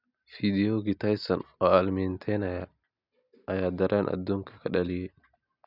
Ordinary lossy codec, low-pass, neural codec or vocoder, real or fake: none; 5.4 kHz; none; real